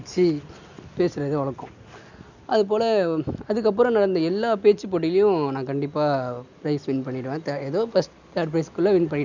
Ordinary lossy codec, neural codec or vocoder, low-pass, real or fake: none; none; 7.2 kHz; real